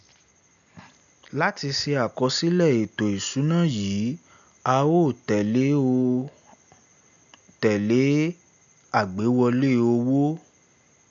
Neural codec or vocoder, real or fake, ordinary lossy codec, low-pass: none; real; none; 7.2 kHz